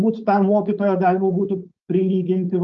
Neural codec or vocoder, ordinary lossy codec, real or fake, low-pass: codec, 16 kHz, 4.8 kbps, FACodec; Opus, 32 kbps; fake; 7.2 kHz